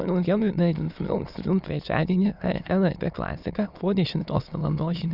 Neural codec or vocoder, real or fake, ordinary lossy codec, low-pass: autoencoder, 22.05 kHz, a latent of 192 numbers a frame, VITS, trained on many speakers; fake; Opus, 64 kbps; 5.4 kHz